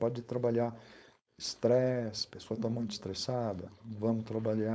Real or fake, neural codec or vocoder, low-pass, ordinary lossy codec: fake; codec, 16 kHz, 4.8 kbps, FACodec; none; none